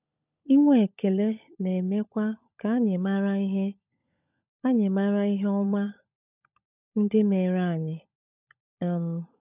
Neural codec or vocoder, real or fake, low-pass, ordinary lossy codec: codec, 16 kHz, 16 kbps, FunCodec, trained on LibriTTS, 50 frames a second; fake; 3.6 kHz; none